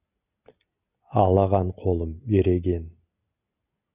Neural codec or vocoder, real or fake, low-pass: none; real; 3.6 kHz